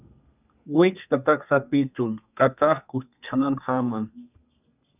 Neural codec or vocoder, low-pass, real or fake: codec, 32 kHz, 1.9 kbps, SNAC; 3.6 kHz; fake